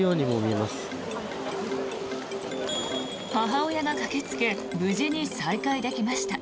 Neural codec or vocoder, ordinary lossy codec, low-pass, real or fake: none; none; none; real